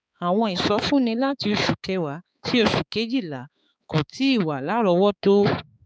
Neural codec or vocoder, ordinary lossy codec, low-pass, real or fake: codec, 16 kHz, 4 kbps, X-Codec, HuBERT features, trained on balanced general audio; none; none; fake